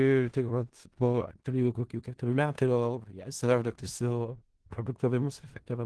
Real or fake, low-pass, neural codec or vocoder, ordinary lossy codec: fake; 10.8 kHz; codec, 16 kHz in and 24 kHz out, 0.4 kbps, LongCat-Audio-Codec, four codebook decoder; Opus, 16 kbps